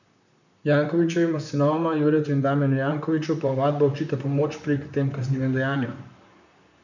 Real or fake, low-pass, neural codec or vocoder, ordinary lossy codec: fake; 7.2 kHz; vocoder, 44.1 kHz, 128 mel bands, Pupu-Vocoder; none